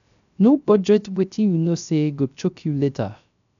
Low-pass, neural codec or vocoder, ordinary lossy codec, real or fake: 7.2 kHz; codec, 16 kHz, 0.3 kbps, FocalCodec; none; fake